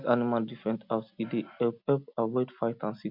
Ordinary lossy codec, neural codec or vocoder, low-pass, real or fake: MP3, 48 kbps; none; 5.4 kHz; real